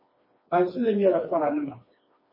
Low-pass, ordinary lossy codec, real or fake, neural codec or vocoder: 5.4 kHz; MP3, 24 kbps; fake; codec, 16 kHz, 2 kbps, FreqCodec, smaller model